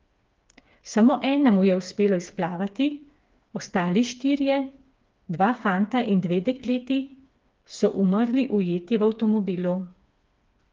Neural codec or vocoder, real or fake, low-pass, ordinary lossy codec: codec, 16 kHz, 4 kbps, FreqCodec, smaller model; fake; 7.2 kHz; Opus, 32 kbps